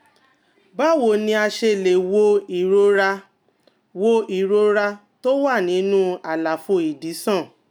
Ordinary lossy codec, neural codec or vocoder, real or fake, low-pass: none; none; real; 19.8 kHz